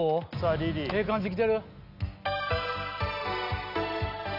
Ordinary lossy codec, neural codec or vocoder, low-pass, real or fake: none; none; 5.4 kHz; real